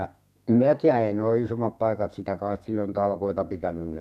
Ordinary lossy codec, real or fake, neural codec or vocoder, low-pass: none; fake; codec, 32 kHz, 1.9 kbps, SNAC; 14.4 kHz